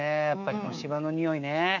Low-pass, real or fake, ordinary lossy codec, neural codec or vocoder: 7.2 kHz; fake; none; codec, 24 kHz, 3.1 kbps, DualCodec